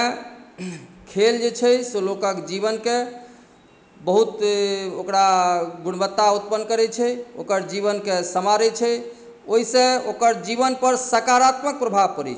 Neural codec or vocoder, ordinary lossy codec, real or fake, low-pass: none; none; real; none